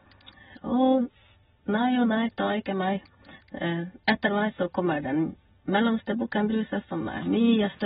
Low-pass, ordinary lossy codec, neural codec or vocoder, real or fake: 10.8 kHz; AAC, 16 kbps; none; real